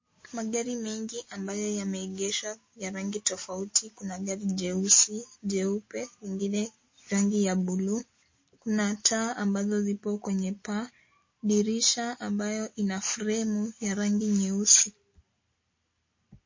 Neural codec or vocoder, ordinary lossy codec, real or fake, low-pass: none; MP3, 32 kbps; real; 7.2 kHz